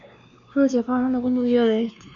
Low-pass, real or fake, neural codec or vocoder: 7.2 kHz; fake; codec, 16 kHz, 2 kbps, X-Codec, WavLM features, trained on Multilingual LibriSpeech